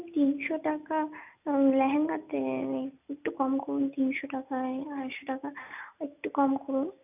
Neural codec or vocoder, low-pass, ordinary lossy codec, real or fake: none; 3.6 kHz; none; real